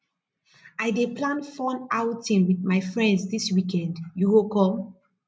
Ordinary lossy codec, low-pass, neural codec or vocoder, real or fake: none; none; none; real